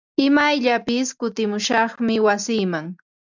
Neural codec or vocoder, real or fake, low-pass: none; real; 7.2 kHz